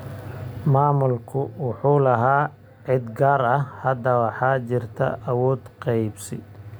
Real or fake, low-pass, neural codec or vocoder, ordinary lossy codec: real; none; none; none